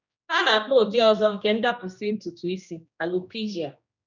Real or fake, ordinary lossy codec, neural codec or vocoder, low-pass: fake; none; codec, 16 kHz, 1 kbps, X-Codec, HuBERT features, trained on general audio; 7.2 kHz